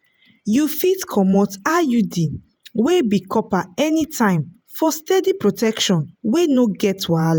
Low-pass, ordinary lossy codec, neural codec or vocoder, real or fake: none; none; vocoder, 48 kHz, 128 mel bands, Vocos; fake